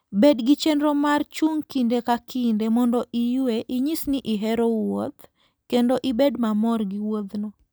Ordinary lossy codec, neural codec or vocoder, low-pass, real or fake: none; none; none; real